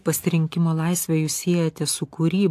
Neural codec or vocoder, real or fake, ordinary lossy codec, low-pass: none; real; AAC, 64 kbps; 14.4 kHz